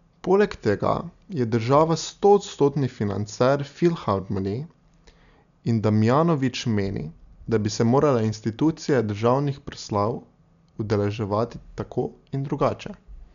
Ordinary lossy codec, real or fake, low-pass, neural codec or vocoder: none; real; 7.2 kHz; none